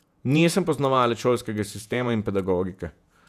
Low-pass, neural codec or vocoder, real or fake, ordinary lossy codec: 14.4 kHz; vocoder, 48 kHz, 128 mel bands, Vocos; fake; none